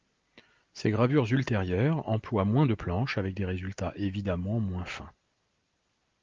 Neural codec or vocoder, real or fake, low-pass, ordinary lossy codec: none; real; 7.2 kHz; Opus, 24 kbps